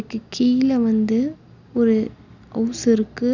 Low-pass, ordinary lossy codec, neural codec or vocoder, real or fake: 7.2 kHz; none; none; real